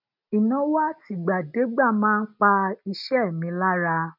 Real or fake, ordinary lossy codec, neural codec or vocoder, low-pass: real; none; none; 5.4 kHz